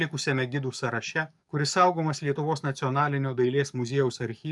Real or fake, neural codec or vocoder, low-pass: fake; codec, 44.1 kHz, 7.8 kbps, DAC; 10.8 kHz